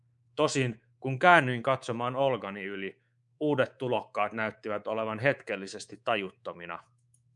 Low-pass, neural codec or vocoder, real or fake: 10.8 kHz; codec, 24 kHz, 3.1 kbps, DualCodec; fake